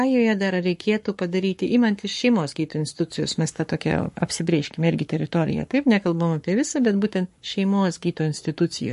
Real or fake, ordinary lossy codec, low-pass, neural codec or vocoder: fake; MP3, 48 kbps; 14.4 kHz; codec, 44.1 kHz, 7.8 kbps, Pupu-Codec